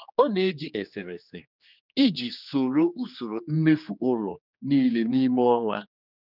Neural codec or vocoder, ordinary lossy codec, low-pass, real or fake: codec, 16 kHz, 2 kbps, X-Codec, HuBERT features, trained on general audio; none; 5.4 kHz; fake